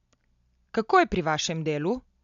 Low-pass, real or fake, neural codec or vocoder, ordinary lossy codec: 7.2 kHz; real; none; AAC, 96 kbps